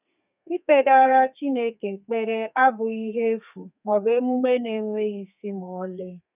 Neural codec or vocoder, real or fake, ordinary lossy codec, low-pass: codec, 32 kHz, 1.9 kbps, SNAC; fake; none; 3.6 kHz